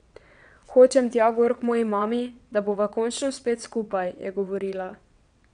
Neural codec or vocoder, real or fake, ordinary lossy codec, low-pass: vocoder, 22.05 kHz, 80 mel bands, WaveNeXt; fake; none; 9.9 kHz